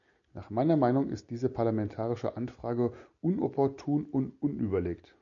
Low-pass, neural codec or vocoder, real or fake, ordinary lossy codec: 7.2 kHz; none; real; MP3, 48 kbps